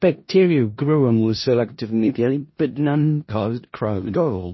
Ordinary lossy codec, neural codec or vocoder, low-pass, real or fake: MP3, 24 kbps; codec, 16 kHz in and 24 kHz out, 0.4 kbps, LongCat-Audio-Codec, four codebook decoder; 7.2 kHz; fake